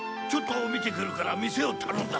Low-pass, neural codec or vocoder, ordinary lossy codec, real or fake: none; none; none; real